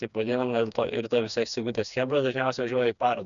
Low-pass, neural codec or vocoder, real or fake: 7.2 kHz; codec, 16 kHz, 2 kbps, FreqCodec, smaller model; fake